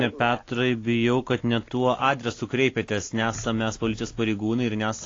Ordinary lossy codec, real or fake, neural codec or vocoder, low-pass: AAC, 32 kbps; real; none; 7.2 kHz